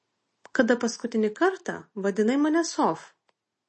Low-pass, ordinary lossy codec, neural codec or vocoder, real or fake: 10.8 kHz; MP3, 32 kbps; none; real